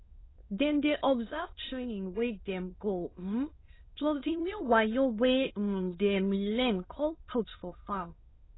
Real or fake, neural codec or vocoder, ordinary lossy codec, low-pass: fake; autoencoder, 22.05 kHz, a latent of 192 numbers a frame, VITS, trained on many speakers; AAC, 16 kbps; 7.2 kHz